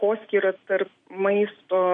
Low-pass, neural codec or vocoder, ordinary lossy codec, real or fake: 9.9 kHz; none; MP3, 48 kbps; real